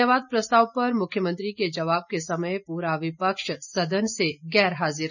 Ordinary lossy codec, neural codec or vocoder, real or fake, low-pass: none; none; real; 7.2 kHz